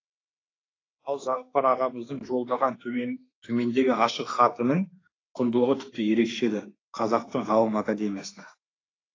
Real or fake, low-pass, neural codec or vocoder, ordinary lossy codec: fake; 7.2 kHz; autoencoder, 48 kHz, 32 numbers a frame, DAC-VAE, trained on Japanese speech; AAC, 32 kbps